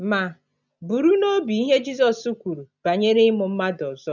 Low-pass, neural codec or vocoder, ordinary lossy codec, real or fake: 7.2 kHz; none; none; real